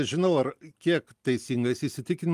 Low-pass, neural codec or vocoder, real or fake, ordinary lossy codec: 14.4 kHz; none; real; Opus, 32 kbps